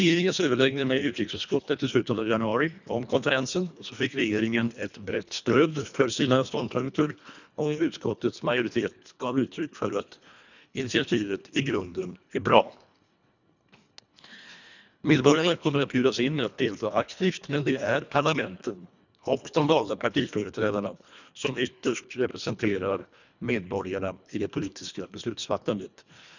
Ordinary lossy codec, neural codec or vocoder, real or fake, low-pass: none; codec, 24 kHz, 1.5 kbps, HILCodec; fake; 7.2 kHz